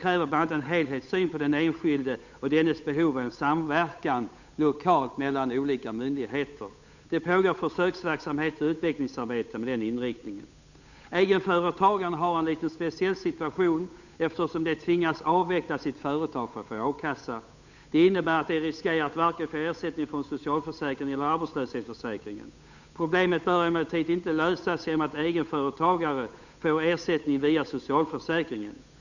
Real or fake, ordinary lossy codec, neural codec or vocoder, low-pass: fake; none; codec, 16 kHz, 8 kbps, FunCodec, trained on Chinese and English, 25 frames a second; 7.2 kHz